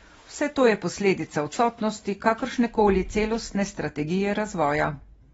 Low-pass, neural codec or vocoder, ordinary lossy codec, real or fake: 19.8 kHz; none; AAC, 24 kbps; real